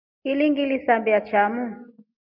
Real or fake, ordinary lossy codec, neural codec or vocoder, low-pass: real; MP3, 48 kbps; none; 5.4 kHz